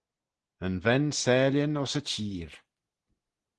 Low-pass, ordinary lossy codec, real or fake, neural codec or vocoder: 10.8 kHz; Opus, 16 kbps; real; none